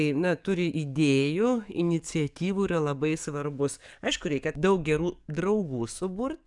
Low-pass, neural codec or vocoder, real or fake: 10.8 kHz; codec, 44.1 kHz, 7.8 kbps, DAC; fake